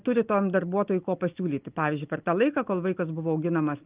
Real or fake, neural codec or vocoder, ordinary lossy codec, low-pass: real; none; Opus, 32 kbps; 3.6 kHz